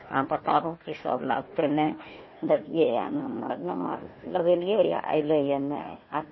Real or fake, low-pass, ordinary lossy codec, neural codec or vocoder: fake; 7.2 kHz; MP3, 24 kbps; codec, 16 kHz, 1 kbps, FunCodec, trained on Chinese and English, 50 frames a second